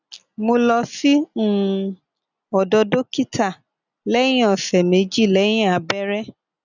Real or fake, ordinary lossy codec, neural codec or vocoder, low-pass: real; none; none; 7.2 kHz